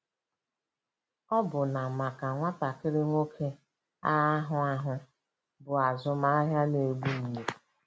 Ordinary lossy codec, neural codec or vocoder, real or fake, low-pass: none; none; real; none